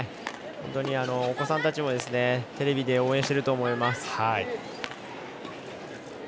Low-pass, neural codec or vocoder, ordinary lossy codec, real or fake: none; none; none; real